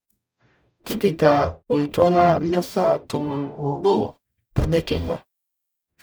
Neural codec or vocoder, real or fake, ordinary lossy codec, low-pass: codec, 44.1 kHz, 0.9 kbps, DAC; fake; none; none